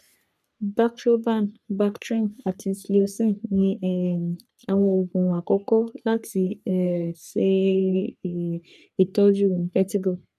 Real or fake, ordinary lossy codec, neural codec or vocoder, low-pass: fake; none; codec, 44.1 kHz, 3.4 kbps, Pupu-Codec; 14.4 kHz